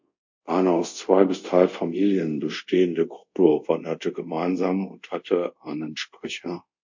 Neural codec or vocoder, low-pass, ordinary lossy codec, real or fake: codec, 24 kHz, 0.5 kbps, DualCodec; 7.2 kHz; MP3, 48 kbps; fake